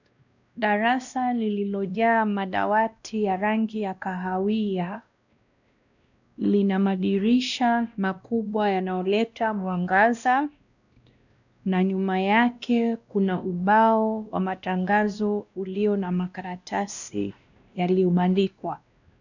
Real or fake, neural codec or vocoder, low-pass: fake; codec, 16 kHz, 1 kbps, X-Codec, WavLM features, trained on Multilingual LibriSpeech; 7.2 kHz